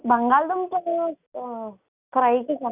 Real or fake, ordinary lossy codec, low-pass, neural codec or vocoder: real; Opus, 64 kbps; 3.6 kHz; none